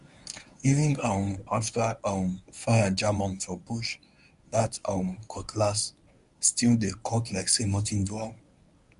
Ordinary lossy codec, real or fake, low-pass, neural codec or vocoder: none; fake; 10.8 kHz; codec, 24 kHz, 0.9 kbps, WavTokenizer, medium speech release version 1